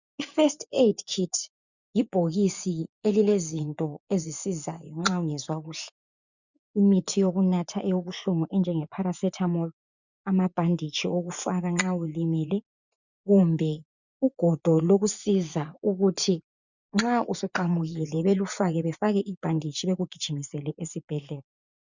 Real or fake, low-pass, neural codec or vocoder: fake; 7.2 kHz; vocoder, 24 kHz, 100 mel bands, Vocos